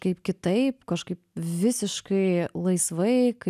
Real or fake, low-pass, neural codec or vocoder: real; 14.4 kHz; none